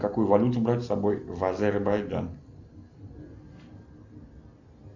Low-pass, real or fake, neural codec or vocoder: 7.2 kHz; real; none